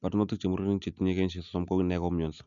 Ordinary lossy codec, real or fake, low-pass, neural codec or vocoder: none; real; 7.2 kHz; none